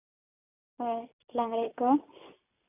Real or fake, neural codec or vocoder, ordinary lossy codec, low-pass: real; none; none; 3.6 kHz